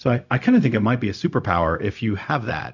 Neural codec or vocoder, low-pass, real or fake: codec, 16 kHz, 0.4 kbps, LongCat-Audio-Codec; 7.2 kHz; fake